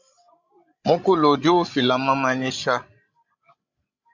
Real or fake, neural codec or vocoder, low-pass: fake; codec, 16 kHz, 16 kbps, FreqCodec, larger model; 7.2 kHz